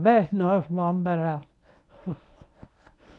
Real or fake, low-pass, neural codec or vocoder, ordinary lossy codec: fake; 10.8 kHz; codec, 24 kHz, 0.9 kbps, WavTokenizer, medium speech release version 1; none